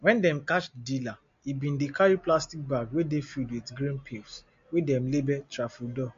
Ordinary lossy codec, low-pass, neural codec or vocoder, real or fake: MP3, 48 kbps; 7.2 kHz; none; real